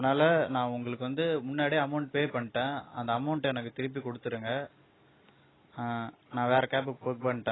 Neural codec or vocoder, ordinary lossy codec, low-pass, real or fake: none; AAC, 16 kbps; 7.2 kHz; real